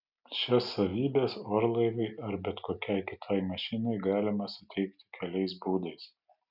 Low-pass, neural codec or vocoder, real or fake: 5.4 kHz; none; real